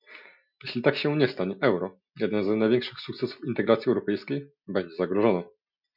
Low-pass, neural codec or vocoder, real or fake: 5.4 kHz; none; real